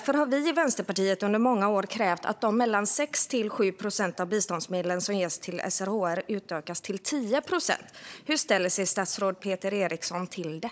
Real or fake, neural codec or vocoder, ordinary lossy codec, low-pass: fake; codec, 16 kHz, 16 kbps, FunCodec, trained on Chinese and English, 50 frames a second; none; none